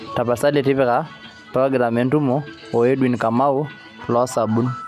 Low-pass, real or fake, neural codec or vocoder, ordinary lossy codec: 14.4 kHz; real; none; none